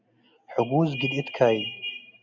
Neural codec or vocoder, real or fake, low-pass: none; real; 7.2 kHz